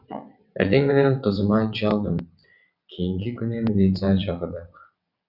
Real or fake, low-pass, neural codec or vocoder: fake; 5.4 kHz; vocoder, 22.05 kHz, 80 mel bands, WaveNeXt